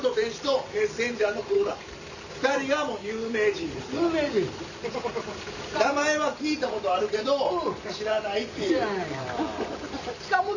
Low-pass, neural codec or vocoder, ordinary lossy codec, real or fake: 7.2 kHz; none; none; real